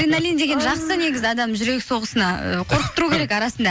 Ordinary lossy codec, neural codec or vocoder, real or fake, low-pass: none; none; real; none